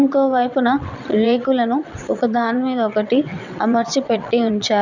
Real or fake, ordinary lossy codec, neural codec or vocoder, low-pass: fake; none; vocoder, 22.05 kHz, 80 mel bands, WaveNeXt; 7.2 kHz